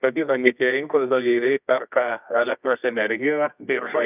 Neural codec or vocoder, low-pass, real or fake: codec, 24 kHz, 0.9 kbps, WavTokenizer, medium music audio release; 3.6 kHz; fake